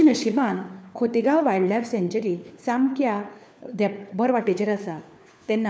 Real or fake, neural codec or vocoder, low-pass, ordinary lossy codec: fake; codec, 16 kHz, 4 kbps, FunCodec, trained on LibriTTS, 50 frames a second; none; none